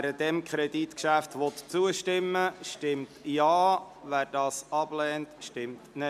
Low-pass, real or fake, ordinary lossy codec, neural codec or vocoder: 14.4 kHz; real; MP3, 96 kbps; none